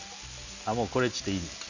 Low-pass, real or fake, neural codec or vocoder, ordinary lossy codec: 7.2 kHz; real; none; none